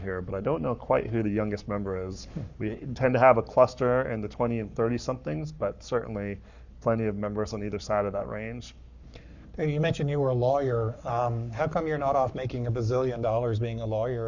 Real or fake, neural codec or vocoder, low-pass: fake; codec, 44.1 kHz, 7.8 kbps, Pupu-Codec; 7.2 kHz